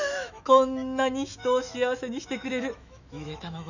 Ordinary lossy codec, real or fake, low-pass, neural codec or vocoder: none; fake; 7.2 kHz; autoencoder, 48 kHz, 128 numbers a frame, DAC-VAE, trained on Japanese speech